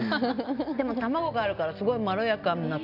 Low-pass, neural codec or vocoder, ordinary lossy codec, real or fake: 5.4 kHz; none; none; real